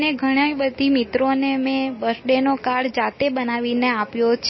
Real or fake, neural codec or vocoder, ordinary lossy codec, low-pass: real; none; MP3, 24 kbps; 7.2 kHz